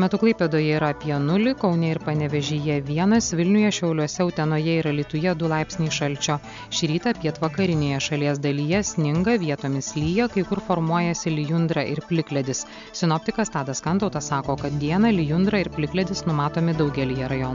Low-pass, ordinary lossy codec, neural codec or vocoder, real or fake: 7.2 kHz; MP3, 64 kbps; none; real